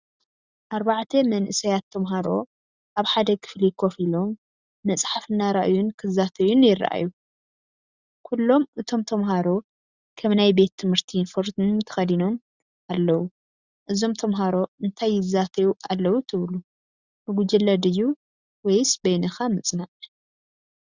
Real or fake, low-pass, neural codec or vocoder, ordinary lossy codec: real; 7.2 kHz; none; Opus, 64 kbps